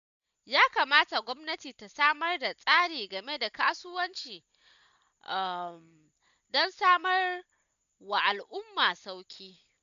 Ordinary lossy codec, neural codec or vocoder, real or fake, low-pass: none; none; real; 7.2 kHz